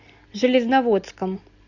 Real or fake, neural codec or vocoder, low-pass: real; none; 7.2 kHz